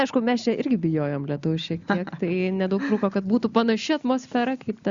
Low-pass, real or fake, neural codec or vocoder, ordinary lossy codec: 7.2 kHz; real; none; Opus, 64 kbps